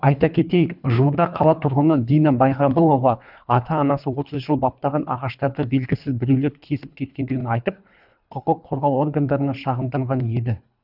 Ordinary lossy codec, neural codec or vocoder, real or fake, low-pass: none; codec, 24 kHz, 3 kbps, HILCodec; fake; 5.4 kHz